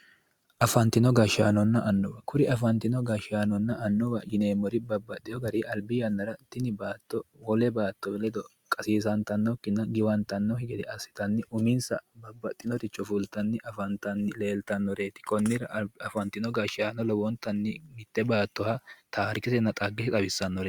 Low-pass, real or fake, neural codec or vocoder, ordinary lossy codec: 19.8 kHz; real; none; Opus, 64 kbps